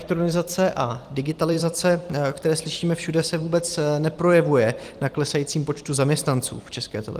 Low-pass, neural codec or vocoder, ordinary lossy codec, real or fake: 14.4 kHz; vocoder, 44.1 kHz, 128 mel bands every 256 samples, BigVGAN v2; Opus, 24 kbps; fake